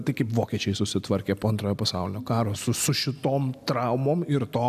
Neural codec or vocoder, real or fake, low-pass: none; real; 14.4 kHz